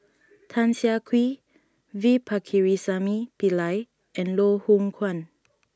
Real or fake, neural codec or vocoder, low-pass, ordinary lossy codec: real; none; none; none